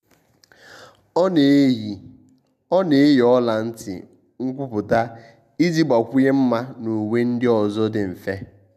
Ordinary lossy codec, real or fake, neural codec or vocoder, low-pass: none; real; none; 14.4 kHz